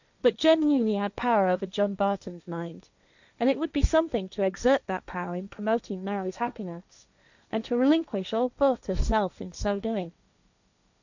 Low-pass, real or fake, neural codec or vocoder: 7.2 kHz; fake; codec, 16 kHz, 1.1 kbps, Voila-Tokenizer